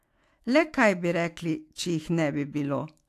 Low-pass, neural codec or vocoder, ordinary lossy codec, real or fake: 14.4 kHz; autoencoder, 48 kHz, 128 numbers a frame, DAC-VAE, trained on Japanese speech; AAC, 64 kbps; fake